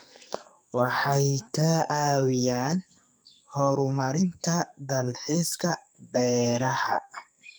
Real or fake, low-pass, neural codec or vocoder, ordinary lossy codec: fake; none; codec, 44.1 kHz, 2.6 kbps, SNAC; none